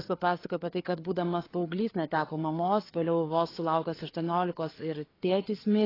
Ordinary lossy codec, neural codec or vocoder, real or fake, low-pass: AAC, 24 kbps; codec, 16 kHz, 4 kbps, FreqCodec, larger model; fake; 5.4 kHz